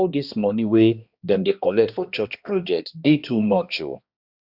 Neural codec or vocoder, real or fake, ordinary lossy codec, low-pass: codec, 16 kHz, 1 kbps, X-Codec, HuBERT features, trained on balanced general audio; fake; Opus, 64 kbps; 5.4 kHz